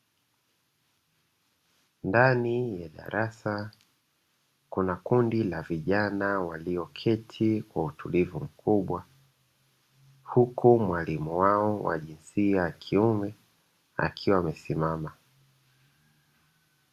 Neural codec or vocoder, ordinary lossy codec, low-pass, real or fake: none; Opus, 64 kbps; 14.4 kHz; real